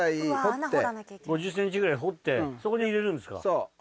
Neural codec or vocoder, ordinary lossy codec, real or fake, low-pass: none; none; real; none